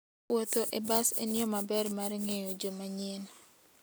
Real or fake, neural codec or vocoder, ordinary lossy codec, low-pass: real; none; none; none